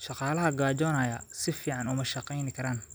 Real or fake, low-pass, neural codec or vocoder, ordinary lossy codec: real; none; none; none